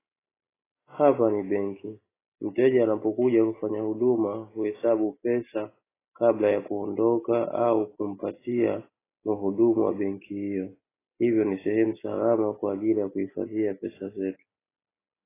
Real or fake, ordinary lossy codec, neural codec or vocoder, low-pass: real; AAC, 16 kbps; none; 3.6 kHz